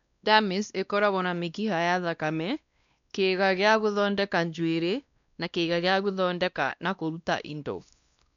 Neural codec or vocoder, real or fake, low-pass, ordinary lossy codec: codec, 16 kHz, 1 kbps, X-Codec, WavLM features, trained on Multilingual LibriSpeech; fake; 7.2 kHz; none